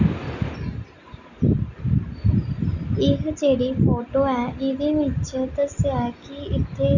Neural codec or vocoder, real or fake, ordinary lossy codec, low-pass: none; real; none; 7.2 kHz